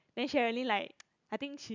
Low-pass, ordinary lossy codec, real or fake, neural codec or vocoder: 7.2 kHz; none; real; none